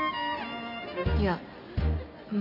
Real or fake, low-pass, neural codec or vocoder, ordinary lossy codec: real; 5.4 kHz; none; AAC, 48 kbps